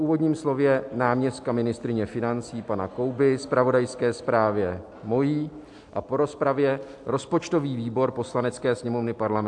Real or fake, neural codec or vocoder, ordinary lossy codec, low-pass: real; none; AAC, 64 kbps; 10.8 kHz